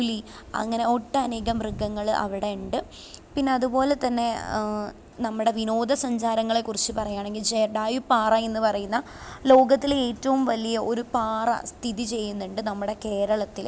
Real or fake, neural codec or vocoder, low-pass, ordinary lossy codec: real; none; none; none